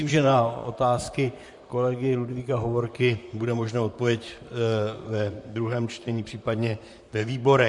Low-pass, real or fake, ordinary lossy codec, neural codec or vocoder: 10.8 kHz; fake; MP3, 64 kbps; vocoder, 44.1 kHz, 128 mel bands, Pupu-Vocoder